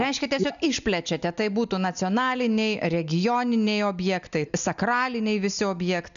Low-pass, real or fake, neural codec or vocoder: 7.2 kHz; real; none